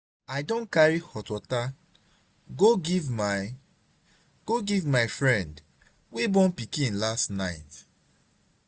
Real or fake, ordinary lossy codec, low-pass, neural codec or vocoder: real; none; none; none